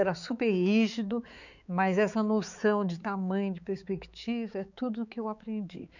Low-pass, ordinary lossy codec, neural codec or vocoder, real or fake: 7.2 kHz; none; codec, 16 kHz, 4 kbps, X-Codec, HuBERT features, trained on balanced general audio; fake